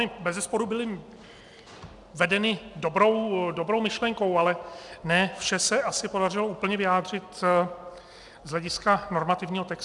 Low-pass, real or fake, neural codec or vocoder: 10.8 kHz; real; none